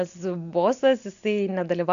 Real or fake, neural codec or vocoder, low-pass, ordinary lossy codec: real; none; 7.2 kHz; MP3, 64 kbps